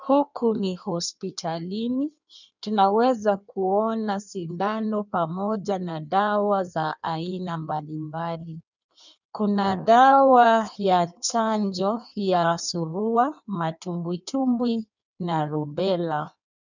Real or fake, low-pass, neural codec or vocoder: fake; 7.2 kHz; codec, 16 kHz in and 24 kHz out, 1.1 kbps, FireRedTTS-2 codec